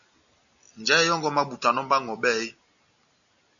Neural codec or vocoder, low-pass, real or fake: none; 7.2 kHz; real